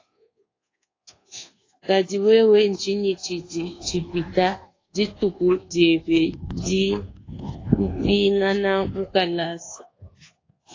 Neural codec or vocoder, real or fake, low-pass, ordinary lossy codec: codec, 24 kHz, 1.2 kbps, DualCodec; fake; 7.2 kHz; AAC, 32 kbps